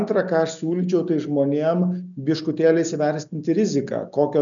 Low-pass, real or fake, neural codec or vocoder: 7.2 kHz; real; none